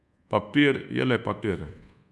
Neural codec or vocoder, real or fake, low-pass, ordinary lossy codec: codec, 24 kHz, 1.2 kbps, DualCodec; fake; none; none